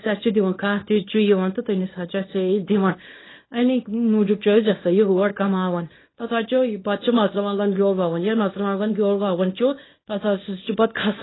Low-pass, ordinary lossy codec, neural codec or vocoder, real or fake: 7.2 kHz; AAC, 16 kbps; codec, 24 kHz, 0.9 kbps, WavTokenizer, small release; fake